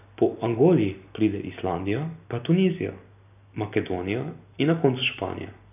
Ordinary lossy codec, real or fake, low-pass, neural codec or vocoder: none; real; 3.6 kHz; none